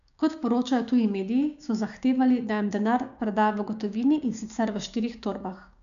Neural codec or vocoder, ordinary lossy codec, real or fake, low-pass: codec, 16 kHz, 6 kbps, DAC; MP3, 96 kbps; fake; 7.2 kHz